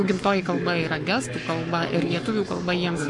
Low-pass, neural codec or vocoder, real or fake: 10.8 kHz; codec, 44.1 kHz, 7.8 kbps, Pupu-Codec; fake